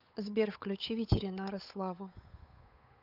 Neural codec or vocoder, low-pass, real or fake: codec, 16 kHz, 8 kbps, FunCodec, trained on Chinese and English, 25 frames a second; 5.4 kHz; fake